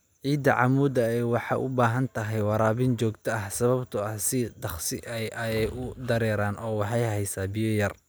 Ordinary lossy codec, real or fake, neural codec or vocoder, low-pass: none; real; none; none